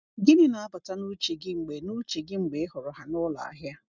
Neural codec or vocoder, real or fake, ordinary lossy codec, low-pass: none; real; none; 7.2 kHz